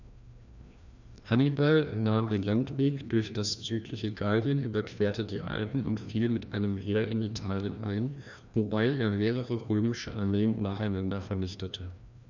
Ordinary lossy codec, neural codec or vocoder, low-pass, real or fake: none; codec, 16 kHz, 1 kbps, FreqCodec, larger model; 7.2 kHz; fake